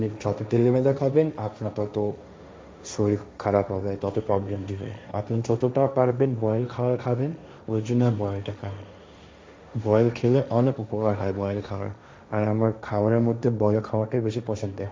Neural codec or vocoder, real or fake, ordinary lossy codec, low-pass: codec, 16 kHz, 1.1 kbps, Voila-Tokenizer; fake; none; none